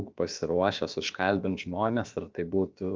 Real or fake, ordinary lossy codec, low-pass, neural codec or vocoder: fake; Opus, 32 kbps; 7.2 kHz; codec, 16 kHz, about 1 kbps, DyCAST, with the encoder's durations